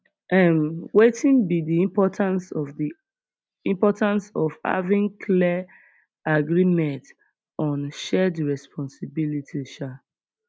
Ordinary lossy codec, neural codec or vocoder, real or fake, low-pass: none; none; real; none